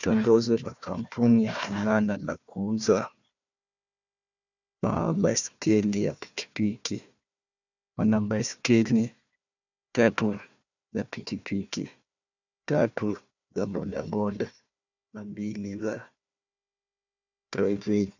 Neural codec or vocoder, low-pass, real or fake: codec, 16 kHz, 1 kbps, FunCodec, trained on Chinese and English, 50 frames a second; 7.2 kHz; fake